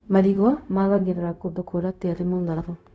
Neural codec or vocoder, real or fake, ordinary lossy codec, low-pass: codec, 16 kHz, 0.4 kbps, LongCat-Audio-Codec; fake; none; none